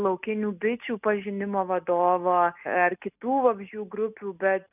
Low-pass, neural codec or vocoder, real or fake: 3.6 kHz; none; real